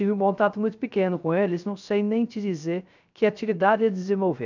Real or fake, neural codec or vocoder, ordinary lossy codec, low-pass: fake; codec, 16 kHz, 0.3 kbps, FocalCodec; none; 7.2 kHz